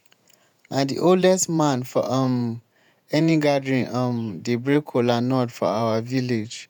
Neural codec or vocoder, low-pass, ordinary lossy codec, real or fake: vocoder, 48 kHz, 128 mel bands, Vocos; none; none; fake